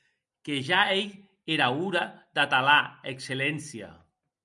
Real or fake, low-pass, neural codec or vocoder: real; 10.8 kHz; none